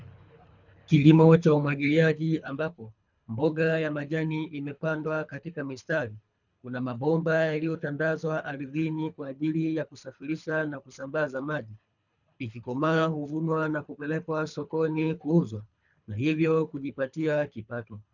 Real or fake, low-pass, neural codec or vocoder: fake; 7.2 kHz; codec, 24 kHz, 3 kbps, HILCodec